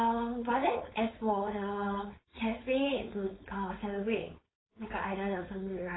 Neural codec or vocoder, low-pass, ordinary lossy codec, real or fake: codec, 16 kHz, 4.8 kbps, FACodec; 7.2 kHz; AAC, 16 kbps; fake